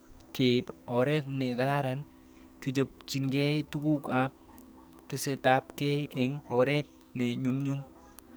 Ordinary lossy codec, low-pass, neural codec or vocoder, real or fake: none; none; codec, 44.1 kHz, 2.6 kbps, SNAC; fake